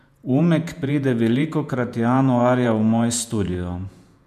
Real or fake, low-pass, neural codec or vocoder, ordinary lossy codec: fake; 14.4 kHz; vocoder, 48 kHz, 128 mel bands, Vocos; MP3, 96 kbps